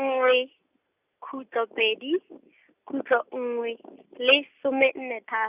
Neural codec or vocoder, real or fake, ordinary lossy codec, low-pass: none; real; Opus, 64 kbps; 3.6 kHz